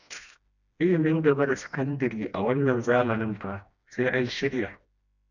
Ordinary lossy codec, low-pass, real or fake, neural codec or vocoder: none; 7.2 kHz; fake; codec, 16 kHz, 1 kbps, FreqCodec, smaller model